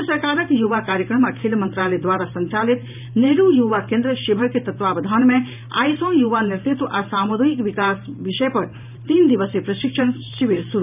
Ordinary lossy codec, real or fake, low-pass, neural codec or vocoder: none; fake; 3.6 kHz; vocoder, 44.1 kHz, 128 mel bands every 512 samples, BigVGAN v2